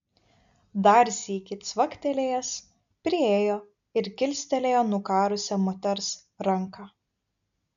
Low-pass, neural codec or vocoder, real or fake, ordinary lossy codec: 7.2 kHz; none; real; MP3, 96 kbps